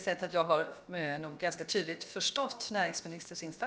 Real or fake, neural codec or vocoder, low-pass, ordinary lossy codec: fake; codec, 16 kHz, about 1 kbps, DyCAST, with the encoder's durations; none; none